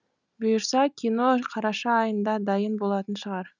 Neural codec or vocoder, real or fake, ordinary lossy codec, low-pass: none; real; none; 7.2 kHz